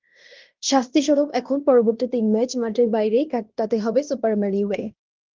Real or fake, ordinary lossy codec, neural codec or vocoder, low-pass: fake; Opus, 32 kbps; codec, 16 kHz in and 24 kHz out, 0.9 kbps, LongCat-Audio-Codec, fine tuned four codebook decoder; 7.2 kHz